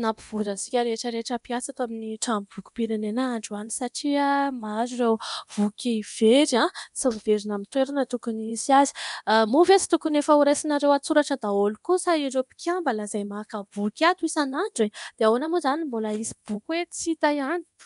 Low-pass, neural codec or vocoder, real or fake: 10.8 kHz; codec, 24 kHz, 0.9 kbps, DualCodec; fake